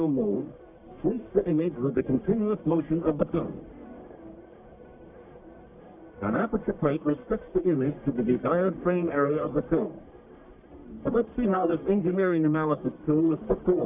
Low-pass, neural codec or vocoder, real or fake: 3.6 kHz; codec, 44.1 kHz, 1.7 kbps, Pupu-Codec; fake